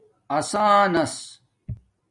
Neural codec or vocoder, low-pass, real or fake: none; 10.8 kHz; real